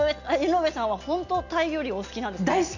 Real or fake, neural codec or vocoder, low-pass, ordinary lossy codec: real; none; 7.2 kHz; none